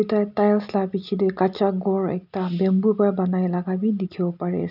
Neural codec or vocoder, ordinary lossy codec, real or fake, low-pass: none; none; real; 5.4 kHz